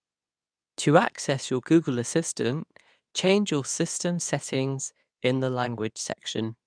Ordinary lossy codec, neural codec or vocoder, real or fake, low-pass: none; codec, 24 kHz, 0.9 kbps, WavTokenizer, medium speech release version 2; fake; 9.9 kHz